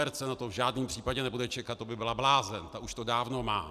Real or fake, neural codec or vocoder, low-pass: real; none; 14.4 kHz